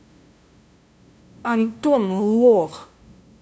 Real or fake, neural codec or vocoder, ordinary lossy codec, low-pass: fake; codec, 16 kHz, 0.5 kbps, FunCodec, trained on LibriTTS, 25 frames a second; none; none